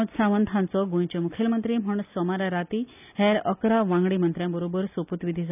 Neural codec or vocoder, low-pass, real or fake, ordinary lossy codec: none; 3.6 kHz; real; none